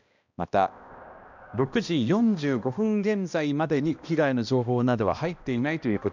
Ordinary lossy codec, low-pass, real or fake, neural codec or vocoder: none; 7.2 kHz; fake; codec, 16 kHz, 1 kbps, X-Codec, HuBERT features, trained on balanced general audio